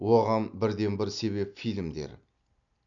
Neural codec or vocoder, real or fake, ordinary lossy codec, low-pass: none; real; none; 7.2 kHz